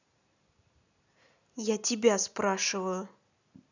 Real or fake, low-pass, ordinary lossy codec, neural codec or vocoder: real; 7.2 kHz; none; none